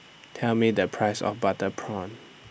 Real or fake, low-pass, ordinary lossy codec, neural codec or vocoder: real; none; none; none